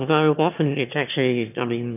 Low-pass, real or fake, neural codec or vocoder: 3.6 kHz; fake; autoencoder, 22.05 kHz, a latent of 192 numbers a frame, VITS, trained on one speaker